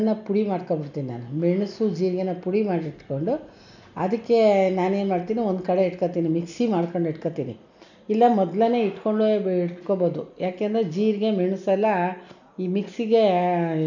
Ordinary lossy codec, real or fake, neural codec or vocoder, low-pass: none; real; none; 7.2 kHz